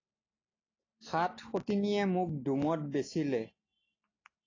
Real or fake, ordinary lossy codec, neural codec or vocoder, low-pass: real; AAC, 32 kbps; none; 7.2 kHz